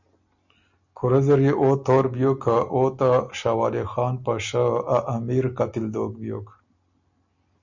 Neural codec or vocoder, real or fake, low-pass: none; real; 7.2 kHz